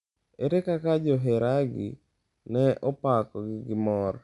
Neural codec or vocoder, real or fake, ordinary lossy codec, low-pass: none; real; none; 10.8 kHz